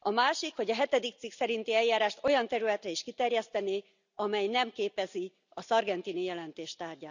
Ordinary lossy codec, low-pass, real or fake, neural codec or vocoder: none; 7.2 kHz; real; none